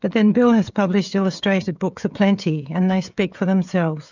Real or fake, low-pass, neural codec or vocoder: fake; 7.2 kHz; codec, 16 kHz, 16 kbps, FreqCodec, smaller model